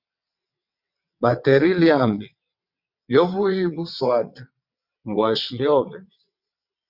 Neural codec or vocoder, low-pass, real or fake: vocoder, 22.05 kHz, 80 mel bands, WaveNeXt; 5.4 kHz; fake